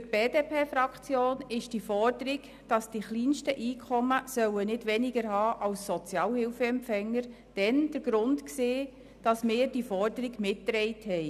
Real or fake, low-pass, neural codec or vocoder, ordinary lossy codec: real; 14.4 kHz; none; none